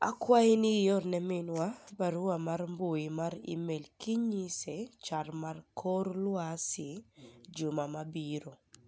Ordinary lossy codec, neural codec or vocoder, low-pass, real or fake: none; none; none; real